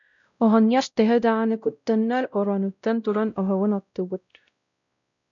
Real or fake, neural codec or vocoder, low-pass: fake; codec, 16 kHz, 0.5 kbps, X-Codec, WavLM features, trained on Multilingual LibriSpeech; 7.2 kHz